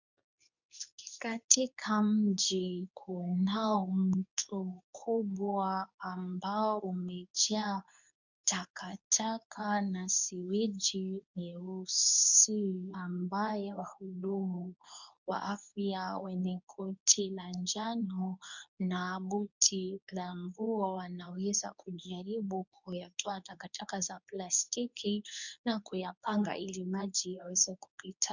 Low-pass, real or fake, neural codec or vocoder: 7.2 kHz; fake; codec, 24 kHz, 0.9 kbps, WavTokenizer, medium speech release version 2